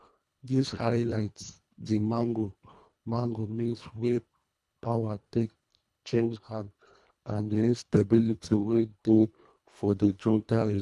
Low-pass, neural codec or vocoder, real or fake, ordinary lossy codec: none; codec, 24 kHz, 1.5 kbps, HILCodec; fake; none